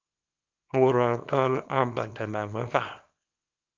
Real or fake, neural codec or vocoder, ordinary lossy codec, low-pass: fake; codec, 24 kHz, 0.9 kbps, WavTokenizer, small release; Opus, 24 kbps; 7.2 kHz